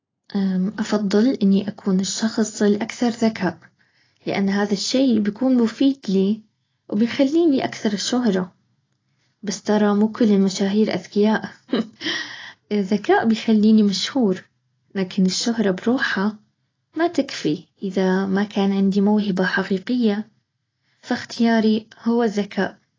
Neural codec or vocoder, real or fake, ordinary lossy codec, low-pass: none; real; AAC, 32 kbps; 7.2 kHz